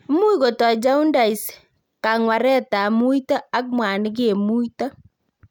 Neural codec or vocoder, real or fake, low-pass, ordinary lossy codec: none; real; 19.8 kHz; none